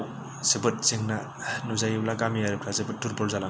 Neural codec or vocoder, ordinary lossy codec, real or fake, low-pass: none; none; real; none